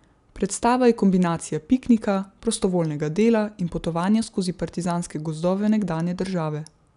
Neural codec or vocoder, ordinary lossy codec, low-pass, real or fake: none; none; 10.8 kHz; real